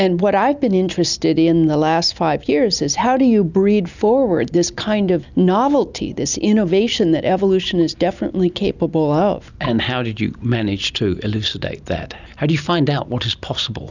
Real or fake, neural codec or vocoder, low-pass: real; none; 7.2 kHz